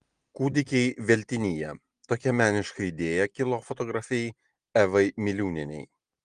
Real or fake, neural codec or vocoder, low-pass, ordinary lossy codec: real; none; 9.9 kHz; Opus, 24 kbps